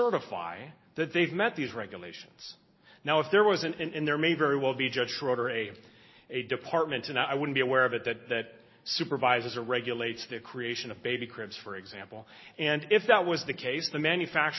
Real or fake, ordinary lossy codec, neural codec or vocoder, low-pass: real; MP3, 24 kbps; none; 7.2 kHz